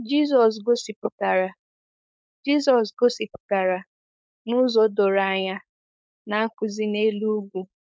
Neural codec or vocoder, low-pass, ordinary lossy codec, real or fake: codec, 16 kHz, 4.8 kbps, FACodec; none; none; fake